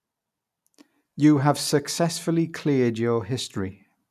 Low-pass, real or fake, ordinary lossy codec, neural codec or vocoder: 14.4 kHz; real; none; none